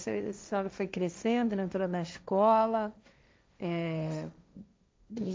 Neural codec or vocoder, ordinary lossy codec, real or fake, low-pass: codec, 16 kHz, 1.1 kbps, Voila-Tokenizer; none; fake; none